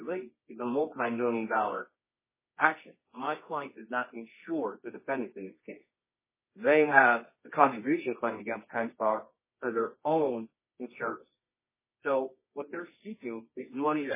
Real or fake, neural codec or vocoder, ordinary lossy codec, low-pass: fake; codec, 24 kHz, 0.9 kbps, WavTokenizer, medium music audio release; MP3, 16 kbps; 3.6 kHz